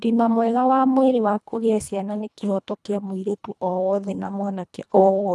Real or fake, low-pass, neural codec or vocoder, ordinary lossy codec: fake; none; codec, 24 kHz, 1.5 kbps, HILCodec; none